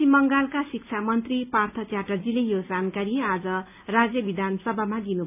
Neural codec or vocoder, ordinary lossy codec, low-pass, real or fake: none; none; 3.6 kHz; real